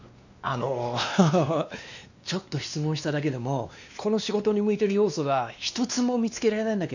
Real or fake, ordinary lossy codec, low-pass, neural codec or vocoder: fake; none; 7.2 kHz; codec, 16 kHz, 2 kbps, X-Codec, WavLM features, trained on Multilingual LibriSpeech